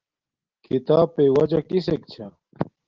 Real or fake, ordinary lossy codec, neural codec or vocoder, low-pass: real; Opus, 16 kbps; none; 7.2 kHz